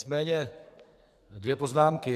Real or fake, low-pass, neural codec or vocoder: fake; 14.4 kHz; codec, 44.1 kHz, 2.6 kbps, SNAC